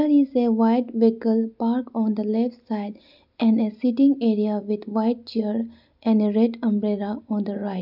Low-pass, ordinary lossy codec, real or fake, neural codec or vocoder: 5.4 kHz; none; real; none